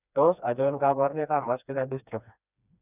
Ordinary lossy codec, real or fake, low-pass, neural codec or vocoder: none; fake; 3.6 kHz; codec, 16 kHz, 2 kbps, FreqCodec, smaller model